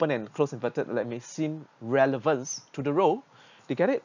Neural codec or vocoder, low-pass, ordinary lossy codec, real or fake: none; 7.2 kHz; none; real